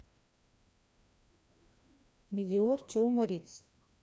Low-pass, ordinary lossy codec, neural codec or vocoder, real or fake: none; none; codec, 16 kHz, 1 kbps, FreqCodec, larger model; fake